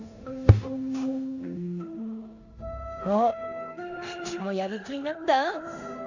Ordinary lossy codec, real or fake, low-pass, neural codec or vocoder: Opus, 64 kbps; fake; 7.2 kHz; codec, 16 kHz in and 24 kHz out, 0.9 kbps, LongCat-Audio-Codec, four codebook decoder